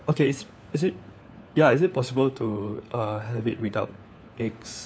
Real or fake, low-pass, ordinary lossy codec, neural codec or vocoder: fake; none; none; codec, 16 kHz, 4 kbps, FunCodec, trained on LibriTTS, 50 frames a second